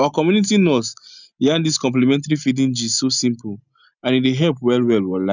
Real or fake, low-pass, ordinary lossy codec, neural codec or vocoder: real; 7.2 kHz; none; none